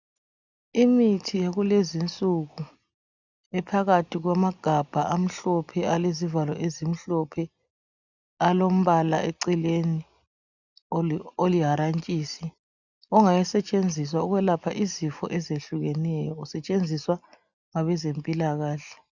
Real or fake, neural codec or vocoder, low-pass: real; none; 7.2 kHz